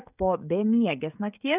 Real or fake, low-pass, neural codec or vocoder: fake; 3.6 kHz; codec, 16 kHz, 16 kbps, FreqCodec, smaller model